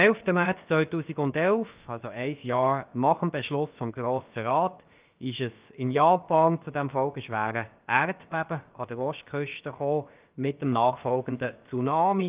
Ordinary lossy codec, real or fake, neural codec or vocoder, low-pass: Opus, 24 kbps; fake; codec, 16 kHz, about 1 kbps, DyCAST, with the encoder's durations; 3.6 kHz